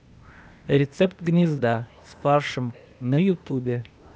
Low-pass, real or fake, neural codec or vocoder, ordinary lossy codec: none; fake; codec, 16 kHz, 0.8 kbps, ZipCodec; none